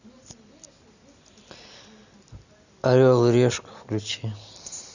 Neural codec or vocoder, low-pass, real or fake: none; 7.2 kHz; real